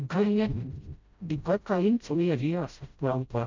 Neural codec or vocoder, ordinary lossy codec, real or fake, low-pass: codec, 16 kHz, 0.5 kbps, FreqCodec, smaller model; MP3, 64 kbps; fake; 7.2 kHz